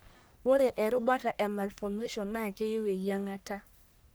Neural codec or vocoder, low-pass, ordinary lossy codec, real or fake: codec, 44.1 kHz, 1.7 kbps, Pupu-Codec; none; none; fake